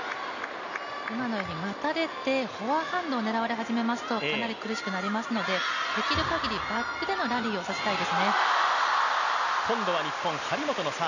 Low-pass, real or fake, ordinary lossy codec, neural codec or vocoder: 7.2 kHz; real; none; none